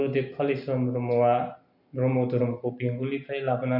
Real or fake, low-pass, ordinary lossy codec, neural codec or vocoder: real; 5.4 kHz; none; none